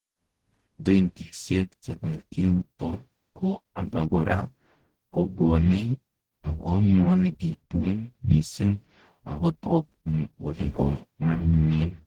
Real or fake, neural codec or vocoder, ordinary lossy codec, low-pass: fake; codec, 44.1 kHz, 0.9 kbps, DAC; Opus, 16 kbps; 19.8 kHz